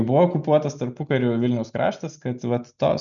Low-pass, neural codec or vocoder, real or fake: 7.2 kHz; none; real